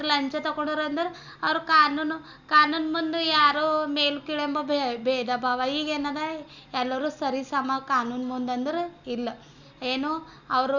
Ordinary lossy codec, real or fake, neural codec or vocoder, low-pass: none; real; none; 7.2 kHz